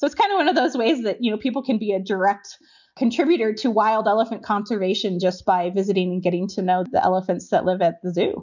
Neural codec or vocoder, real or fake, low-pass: none; real; 7.2 kHz